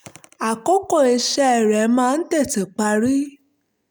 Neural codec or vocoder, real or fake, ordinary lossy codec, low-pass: none; real; none; none